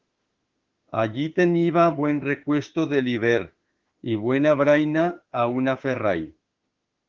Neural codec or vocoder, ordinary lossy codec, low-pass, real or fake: autoencoder, 48 kHz, 32 numbers a frame, DAC-VAE, trained on Japanese speech; Opus, 16 kbps; 7.2 kHz; fake